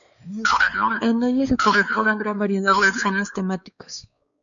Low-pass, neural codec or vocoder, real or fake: 7.2 kHz; codec, 16 kHz, 2 kbps, X-Codec, WavLM features, trained on Multilingual LibriSpeech; fake